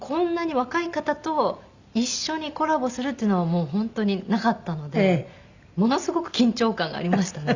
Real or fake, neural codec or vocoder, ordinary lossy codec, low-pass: real; none; Opus, 64 kbps; 7.2 kHz